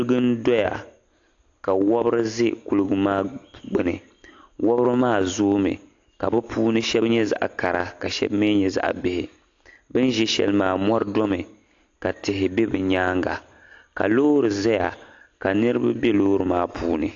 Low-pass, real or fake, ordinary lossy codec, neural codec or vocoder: 7.2 kHz; real; AAC, 48 kbps; none